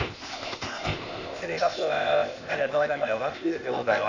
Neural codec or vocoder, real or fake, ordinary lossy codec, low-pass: codec, 16 kHz, 0.8 kbps, ZipCodec; fake; none; 7.2 kHz